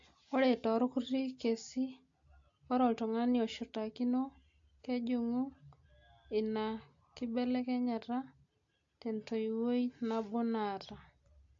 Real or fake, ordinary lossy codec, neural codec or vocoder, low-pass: real; AAC, 48 kbps; none; 7.2 kHz